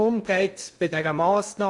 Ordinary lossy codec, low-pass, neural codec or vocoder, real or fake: Opus, 64 kbps; 10.8 kHz; codec, 16 kHz in and 24 kHz out, 0.6 kbps, FocalCodec, streaming, 2048 codes; fake